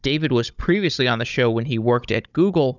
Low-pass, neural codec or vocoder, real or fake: 7.2 kHz; codec, 16 kHz, 16 kbps, FunCodec, trained on Chinese and English, 50 frames a second; fake